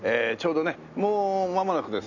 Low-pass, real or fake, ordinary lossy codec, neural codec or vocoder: 7.2 kHz; real; none; none